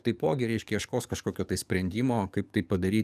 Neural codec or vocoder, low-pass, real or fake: codec, 44.1 kHz, 7.8 kbps, DAC; 14.4 kHz; fake